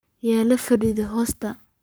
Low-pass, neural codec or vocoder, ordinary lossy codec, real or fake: none; codec, 44.1 kHz, 7.8 kbps, Pupu-Codec; none; fake